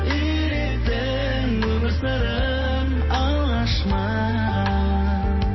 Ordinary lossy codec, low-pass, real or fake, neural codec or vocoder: MP3, 24 kbps; 7.2 kHz; real; none